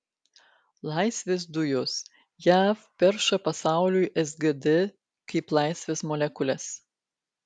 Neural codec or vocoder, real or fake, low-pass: none; real; 9.9 kHz